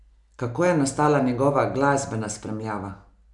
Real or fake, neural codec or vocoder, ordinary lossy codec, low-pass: real; none; none; 10.8 kHz